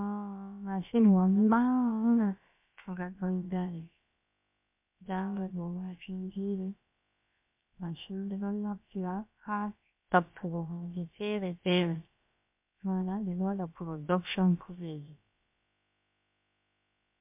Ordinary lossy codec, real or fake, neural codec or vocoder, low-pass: MP3, 32 kbps; fake; codec, 16 kHz, about 1 kbps, DyCAST, with the encoder's durations; 3.6 kHz